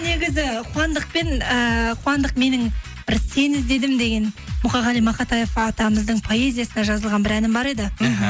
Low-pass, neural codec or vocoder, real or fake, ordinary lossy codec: none; none; real; none